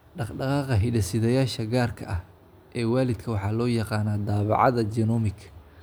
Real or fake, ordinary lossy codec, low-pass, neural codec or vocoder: real; none; none; none